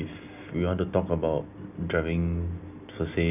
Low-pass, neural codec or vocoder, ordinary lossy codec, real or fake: 3.6 kHz; none; none; real